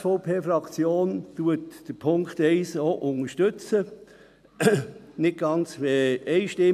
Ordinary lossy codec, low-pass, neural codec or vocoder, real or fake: none; 14.4 kHz; none; real